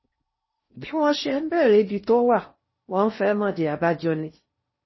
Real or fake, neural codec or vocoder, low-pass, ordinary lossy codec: fake; codec, 16 kHz in and 24 kHz out, 0.6 kbps, FocalCodec, streaming, 4096 codes; 7.2 kHz; MP3, 24 kbps